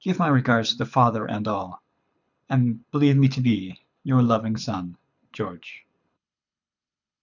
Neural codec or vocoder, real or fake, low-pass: codec, 24 kHz, 6 kbps, HILCodec; fake; 7.2 kHz